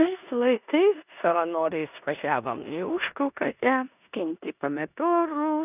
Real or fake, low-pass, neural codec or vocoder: fake; 3.6 kHz; codec, 16 kHz in and 24 kHz out, 0.9 kbps, LongCat-Audio-Codec, four codebook decoder